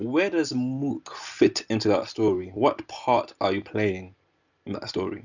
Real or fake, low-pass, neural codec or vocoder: real; 7.2 kHz; none